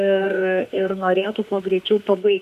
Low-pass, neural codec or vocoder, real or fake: 14.4 kHz; autoencoder, 48 kHz, 32 numbers a frame, DAC-VAE, trained on Japanese speech; fake